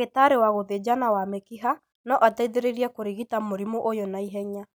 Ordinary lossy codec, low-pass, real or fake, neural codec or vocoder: none; none; real; none